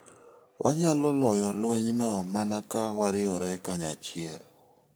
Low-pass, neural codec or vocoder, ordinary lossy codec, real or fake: none; codec, 44.1 kHz, 3.4 kbps, Pupu-Codec; none; fake